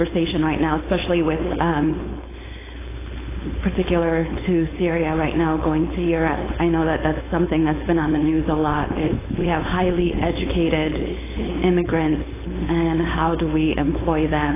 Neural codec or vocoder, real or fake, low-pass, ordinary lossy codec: codec, 16 kHz, 4.8 kbps, FACodec; fake; 3.6 kHz; AAC, 16 kbps